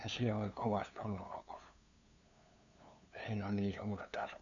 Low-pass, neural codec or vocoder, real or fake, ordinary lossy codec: 7.2 kHz; codec, 16 kHz, 2 kbps, FunCodec, trained on LibriTTS, 25 frames a second; fake; none